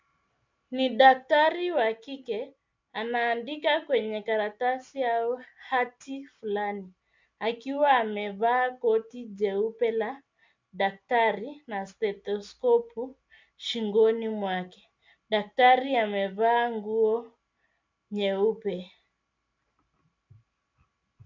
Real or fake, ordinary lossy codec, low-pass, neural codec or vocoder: real; MP3, 64 kbps; 7.2 kHz; none